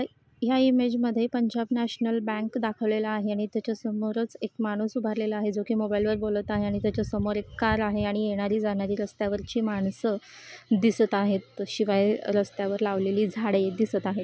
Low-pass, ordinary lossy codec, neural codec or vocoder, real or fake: none; none; none; real